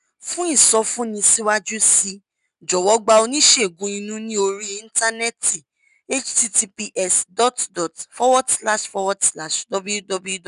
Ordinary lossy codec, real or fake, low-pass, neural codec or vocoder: none; real; 10.8 kHz; none